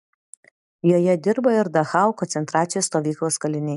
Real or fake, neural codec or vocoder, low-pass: real; none; 10.8 kHz